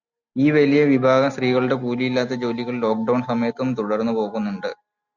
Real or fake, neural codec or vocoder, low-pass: real; none; 7.2 kHz